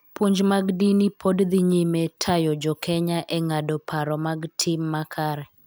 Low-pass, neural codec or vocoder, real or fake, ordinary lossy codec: none; none; real; none